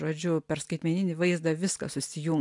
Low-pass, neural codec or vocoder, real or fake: 10.8 kHz; none; real